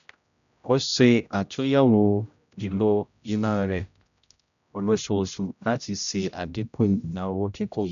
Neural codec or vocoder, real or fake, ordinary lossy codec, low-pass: codec, 16 kHz, 0.5 kbps, X-Codec, HuBERT features, trained on general audio; fake; none; 7.2 kHz